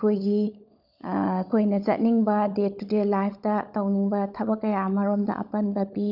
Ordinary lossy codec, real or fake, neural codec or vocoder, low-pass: none; fake; codec, 16 kHz, 16 kbps, FunCodec, trained on LibriTTS, 50 frames a second; 5.4 kHz